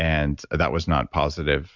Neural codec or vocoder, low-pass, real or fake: none; 7.2 kHz; real